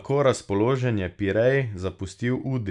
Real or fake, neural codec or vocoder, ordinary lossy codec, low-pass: real; none; none; 10.8 kHz